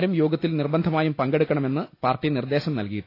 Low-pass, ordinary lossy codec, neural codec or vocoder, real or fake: 5.4 kHz; AAC, 32 kbps; none; real